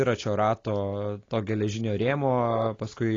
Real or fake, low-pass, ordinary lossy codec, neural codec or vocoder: real; 7.2 kHz; AAC, 32 kbps; none